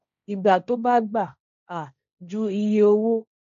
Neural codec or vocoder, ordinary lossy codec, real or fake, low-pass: codec, 16 kHz, 1.1 kbps, Voila-Tokenizer; none; fake; 7.2 kHz